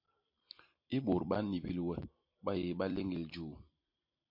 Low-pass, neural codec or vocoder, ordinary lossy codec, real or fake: 5.4 kHz; vocoder, 44.1 kHz, 128 mel bands every 256 samples, BigVGAN v2; MP3, 32 kbps; fake